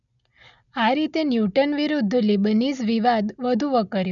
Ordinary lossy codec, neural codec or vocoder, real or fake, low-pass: none; none; real; 7.2 kHz